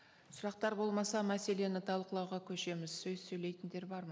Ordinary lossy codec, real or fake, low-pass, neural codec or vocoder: none; real; none; none